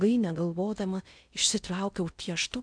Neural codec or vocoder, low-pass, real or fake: codec, 16 kHz in and 24 kHz out, 0.6 kbps, FocalCodec, streaming, 2048 codes; 9.9 kHz; fake